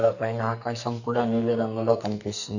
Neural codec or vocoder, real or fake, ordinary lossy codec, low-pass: codec, 44.1 kHz, 2.6 kbps, SNAC; fake; none; 7.2 kHz